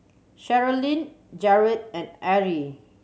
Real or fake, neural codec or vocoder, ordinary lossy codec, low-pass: real; none; none; none